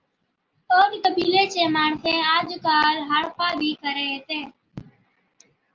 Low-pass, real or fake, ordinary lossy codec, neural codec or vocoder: 7.2 kHz; real; Opus, 24 kbps; none